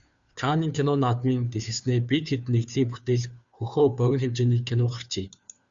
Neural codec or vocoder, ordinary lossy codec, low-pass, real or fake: codec, 16 kHz, 2 kbps, FunCodec, trained on Chinese and English, 25 frames a second; Opus, 64 kbps; 7.2 kHz; fake